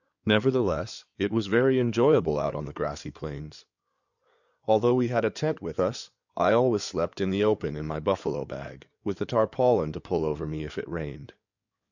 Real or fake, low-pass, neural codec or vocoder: fake; 7.2 kHz; codec, 16 kHz in and 24 kHz out, 2.2 kbps, FireRedTTS-2 codec